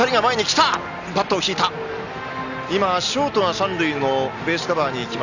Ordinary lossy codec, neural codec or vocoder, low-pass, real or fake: none; none; 7.2 kHz; real